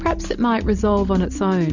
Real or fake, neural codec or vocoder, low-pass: real; none; 7.2 kHz